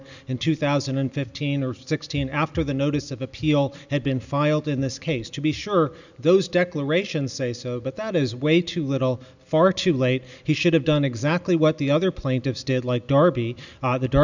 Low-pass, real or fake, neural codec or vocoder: 7.2 kHz; real; none